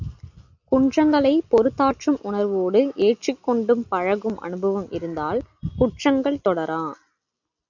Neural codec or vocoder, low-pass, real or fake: none; 7.2 kHz; real